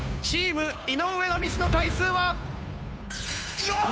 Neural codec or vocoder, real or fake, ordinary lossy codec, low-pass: codec, 16 kHz, 2 kbps, FunCodec, trained on Chinese and English, 25 frames a second; fake; none; none